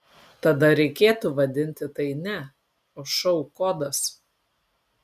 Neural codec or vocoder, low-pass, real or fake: none; 14.4 kHz; real